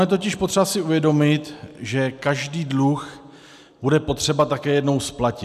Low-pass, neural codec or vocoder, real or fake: 14.4 kHz; none; real